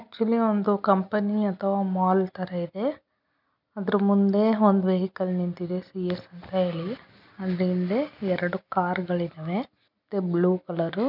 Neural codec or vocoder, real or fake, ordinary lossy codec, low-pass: none; real; none; 5.4 kHz